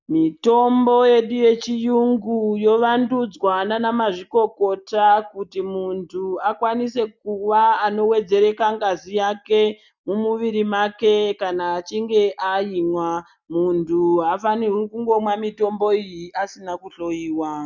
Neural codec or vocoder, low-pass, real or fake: none; 7.2 kHz; real